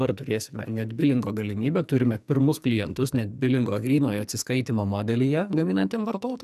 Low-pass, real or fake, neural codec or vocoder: 14.4 kHz; fake; codec, 44.1 kHz, 2.6 kbps, SNAC